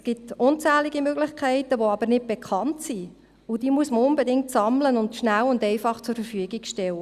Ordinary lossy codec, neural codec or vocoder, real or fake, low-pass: Opus, 64 kbps; none; real; 14.4 kHz